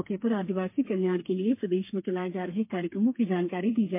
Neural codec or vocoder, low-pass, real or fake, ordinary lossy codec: codec, 32 kHz, 1.9 kbps, SNAC; 3.6 kHz; fake; MP3, 24 kbps